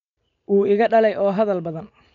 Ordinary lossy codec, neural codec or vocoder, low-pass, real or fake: none; none; 7.2 kHz; real